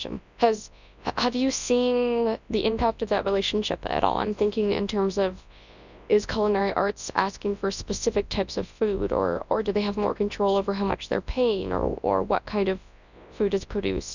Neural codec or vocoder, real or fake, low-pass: codec, 24 kHz, 0.9 kbps, WavTokenizer, large speech release; fake; 7.2 kHz